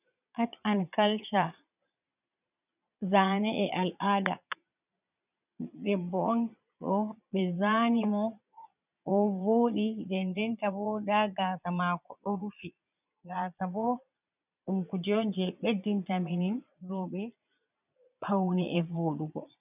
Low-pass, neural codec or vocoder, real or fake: 3.6 kHz; vocoder, 22.05 kHz, 80 mel bands, Vocos; fake